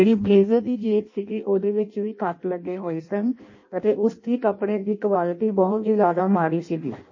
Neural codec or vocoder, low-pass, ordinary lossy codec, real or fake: codec, 16 kHz in and 24 kHz out, 0.6 kbps, FireRedTTS-2 codec; 7.2 kHz; MP3, 32 kbps; fake